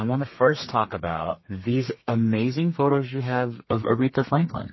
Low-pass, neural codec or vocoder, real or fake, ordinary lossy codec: 7.2 kHz; codec, 32 kHz, 1.9 kbps, SNAC; fake; MP3, 24 kbps